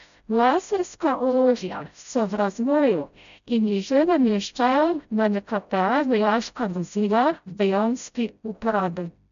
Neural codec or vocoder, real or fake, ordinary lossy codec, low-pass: codec, 16 kHz, 0.5 kbps, FreqCodec, smaller model; fake; none; 7.2 kHz